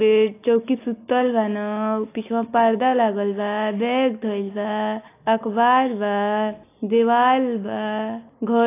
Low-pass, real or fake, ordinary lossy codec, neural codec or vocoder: 3.6 kHz; real; AAC, 24 kbps; none